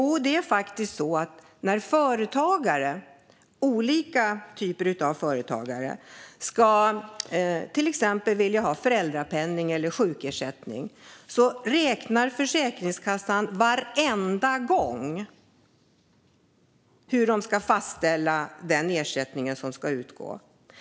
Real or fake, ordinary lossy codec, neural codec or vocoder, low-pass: real; none; none; none